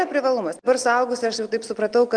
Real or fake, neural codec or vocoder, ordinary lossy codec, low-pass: real; none; Opus, 24 kbps; 9.9 kHz